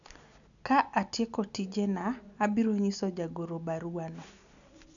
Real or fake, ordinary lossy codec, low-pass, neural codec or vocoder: real; none; 7.2 kHz; none